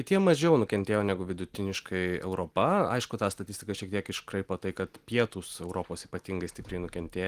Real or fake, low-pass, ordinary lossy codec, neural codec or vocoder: real; 14.4 kHz; Opus, 24 kbps; none